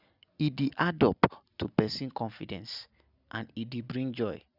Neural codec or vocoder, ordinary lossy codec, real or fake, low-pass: none; none; real; 5.4 kHz